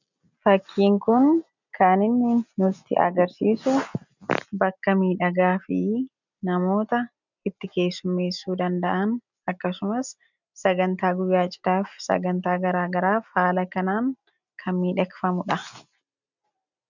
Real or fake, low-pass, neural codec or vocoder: real; 7.2 kHz; none